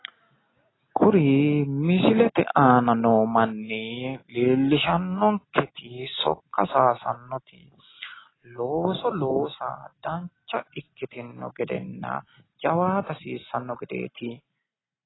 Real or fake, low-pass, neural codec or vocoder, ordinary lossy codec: real; 7.2 kHz; none; AAC, 16 kbps